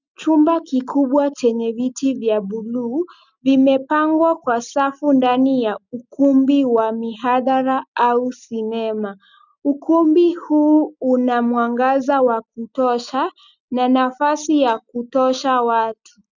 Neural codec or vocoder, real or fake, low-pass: none; real; 7.2 kHz